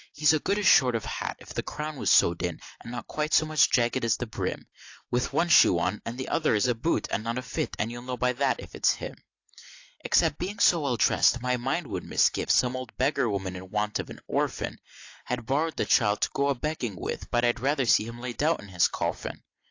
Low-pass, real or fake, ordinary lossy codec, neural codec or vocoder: 7.2 kHz; real; AAC, 48 kbps; none